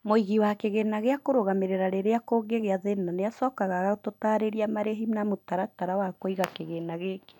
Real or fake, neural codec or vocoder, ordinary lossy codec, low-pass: real; none; none; 19.8 kHz